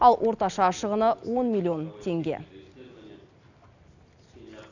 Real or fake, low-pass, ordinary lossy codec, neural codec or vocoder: real; 7.2 kHz; none; none